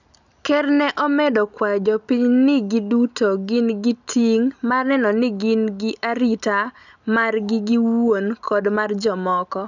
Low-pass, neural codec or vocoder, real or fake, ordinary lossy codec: 7.2 kHz; none; real; none